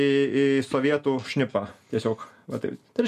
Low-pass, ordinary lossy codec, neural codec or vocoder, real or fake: 14.4 kHz; MP3, 64 kbps; none; real